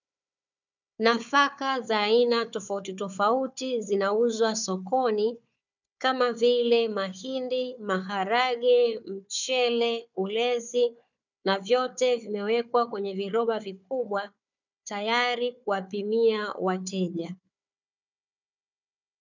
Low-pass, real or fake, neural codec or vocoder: 7.2 kHz; fake; codec, 16 kHz, 4 kbps, FunCodec, trained on Chinese and English, 50 frames a second